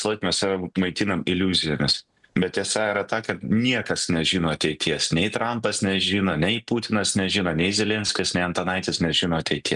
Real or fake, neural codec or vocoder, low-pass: real; none; 10.8 kHz